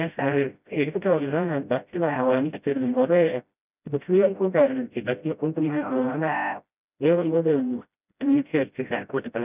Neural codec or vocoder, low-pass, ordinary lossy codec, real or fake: codec, 16 kHz, 0.5 kbps, FreqCodec, smaller model; 3.6 kHz; none; fake